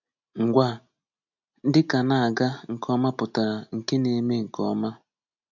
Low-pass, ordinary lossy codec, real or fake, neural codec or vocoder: 7.2 kHz; none; real; none